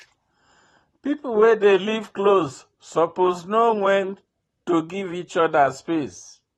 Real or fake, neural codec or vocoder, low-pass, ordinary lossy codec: fake; vocoder, 44.1 kHz, 128 mel bands, Pupu-Vocoder; 19.8 kHz; AAC, 32 kbps